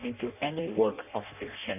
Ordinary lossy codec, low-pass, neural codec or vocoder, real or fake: none; 3.6 kHz; codec, 16 kHz in and 24 kHz out, 0.6 kbps, FireRedTTS-2 codec; fake